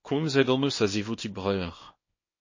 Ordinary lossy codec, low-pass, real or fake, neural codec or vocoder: MP3, 32 kbps; 7.2 kHz; fake; codec, 24 kHz, 0.9 kbps, WavTokenizer, small release